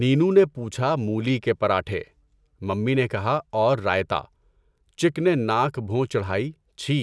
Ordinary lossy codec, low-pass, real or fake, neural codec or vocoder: none; none; real; none